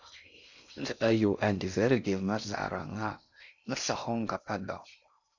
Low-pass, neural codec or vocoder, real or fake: 7.2 kHz; codec, 16 kHz in and 24 kHz out, 0.6 kbps, FocalCodec, streaming, 4096 codes; fake